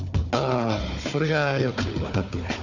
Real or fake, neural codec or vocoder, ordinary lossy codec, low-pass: fake; codec, 16 kHz, 4 kbps, FunCodec, trained on Chinese and English, 50 frames a second; none; 7.2 kHz